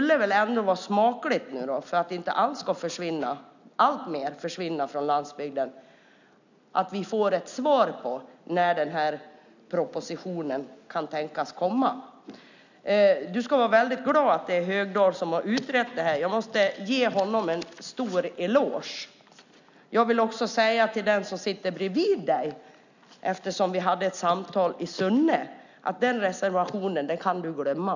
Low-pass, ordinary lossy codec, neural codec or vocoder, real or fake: 7.2 kHz; none; none; real